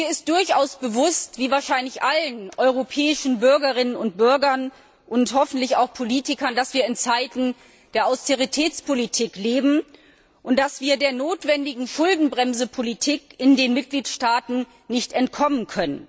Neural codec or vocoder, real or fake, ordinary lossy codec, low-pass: none; real; none; none